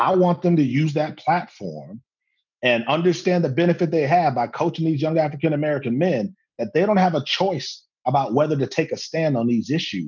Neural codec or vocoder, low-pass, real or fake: none; 7.2 kHz; real